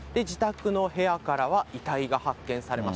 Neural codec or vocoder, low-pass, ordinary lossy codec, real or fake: none; none; none; real